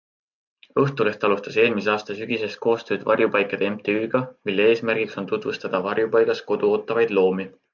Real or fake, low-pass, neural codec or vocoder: real; 7.2 kHz; none